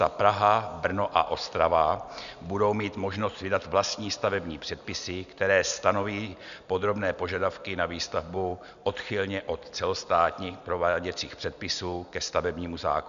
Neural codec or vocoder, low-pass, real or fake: none; 7.2 kHz; real